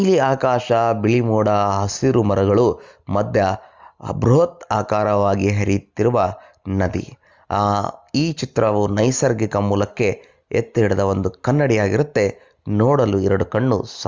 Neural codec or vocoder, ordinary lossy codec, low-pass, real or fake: none; Opus, 32 kbps; 7.2 kHz; real